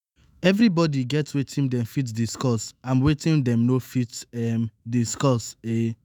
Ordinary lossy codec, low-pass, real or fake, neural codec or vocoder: none; none; fake; autoencoder, 48 kHz, 128 numbers a frame, DAC-VAE, trained on Japanese speech